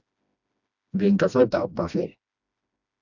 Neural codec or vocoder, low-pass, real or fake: codec, 16 kHz, 1 kbps, FreqCodec, smaller model; 7.2 kHz; fake